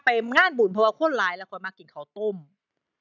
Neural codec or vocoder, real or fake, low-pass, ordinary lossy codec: none; real; 7.2 kHz; none